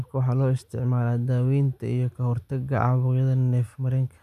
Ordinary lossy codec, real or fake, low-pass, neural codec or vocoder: none; real; 14.4 kHz; none